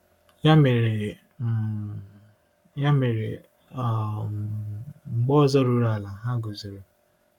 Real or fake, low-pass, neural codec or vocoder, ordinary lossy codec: fake; 19.8 kHz; codec, 44.1 kHz, 7.8 kbps, Pupu-Codec; none